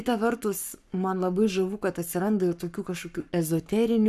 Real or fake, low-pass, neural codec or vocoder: fake; 14.4 kHz; codec, 44.1 kHz, 7.8 kbps, Pupu-Codec